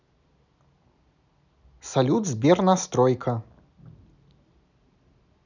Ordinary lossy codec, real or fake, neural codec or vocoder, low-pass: none; real; none; 7.2 kHz